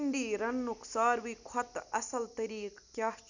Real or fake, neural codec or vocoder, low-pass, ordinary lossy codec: real; none; 7.2 kHz; none